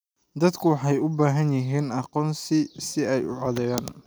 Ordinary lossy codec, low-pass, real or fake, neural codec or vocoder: none; none; real; none